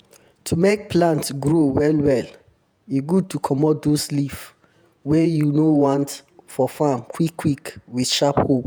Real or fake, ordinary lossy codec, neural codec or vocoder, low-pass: fake; none; vocoder, 48 kHz, 128 mel bands, Vocos; none